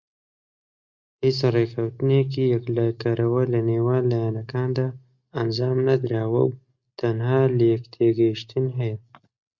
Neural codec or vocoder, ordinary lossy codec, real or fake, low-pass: none; Opus, 64 kbps; real; 7.2 kHz